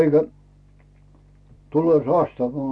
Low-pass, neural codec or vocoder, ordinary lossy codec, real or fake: none; none; none; real